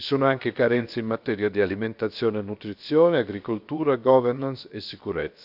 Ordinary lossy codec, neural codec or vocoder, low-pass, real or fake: none; codec, 16 kHz, about 1 kbps, DyCAST, with the encoder's durations; 5.4 kHz; fake